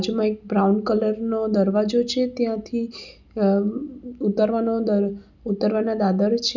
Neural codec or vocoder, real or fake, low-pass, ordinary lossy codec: none; real; 7.2 kHz; none